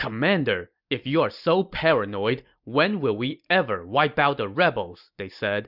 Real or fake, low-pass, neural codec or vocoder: real; 5.4 kHz; none